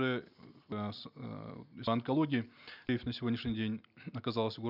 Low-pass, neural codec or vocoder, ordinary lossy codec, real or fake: 5.4 kHz; none; none; real